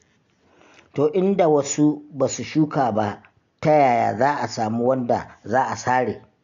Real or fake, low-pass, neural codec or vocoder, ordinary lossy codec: real; 7.2 kHz; none; AAC, 96 kbps